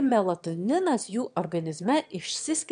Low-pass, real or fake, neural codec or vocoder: 9.9 kHz; fake; autoencoder, 22.05 kHz, a latent of 192 numbers a frame, VITS, trained on one speaker